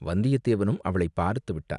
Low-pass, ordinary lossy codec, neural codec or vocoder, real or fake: 10.8 kHz; none; none; real